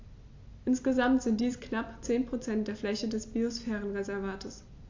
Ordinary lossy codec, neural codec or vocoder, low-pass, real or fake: AAC, 48 kbps; none; 7.2 kHz; real